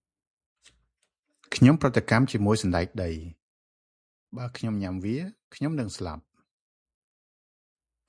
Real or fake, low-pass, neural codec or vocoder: real; 9.9 kHz; none